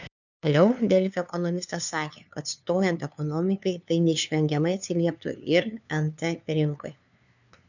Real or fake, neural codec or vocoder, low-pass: fake; codec, 16 kHz, 2 kbps, FunCodec, trained on LibriTTS, 25 frames a second; 7.2 kHz